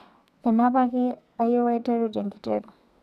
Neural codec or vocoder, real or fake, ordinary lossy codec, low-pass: codec, 32 kHz, 1.9 kbps, SNAC; fake; none; 14.4 kHz